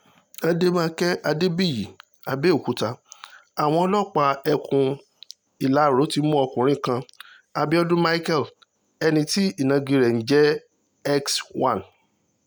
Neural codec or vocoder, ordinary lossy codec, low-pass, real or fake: none; none; none; real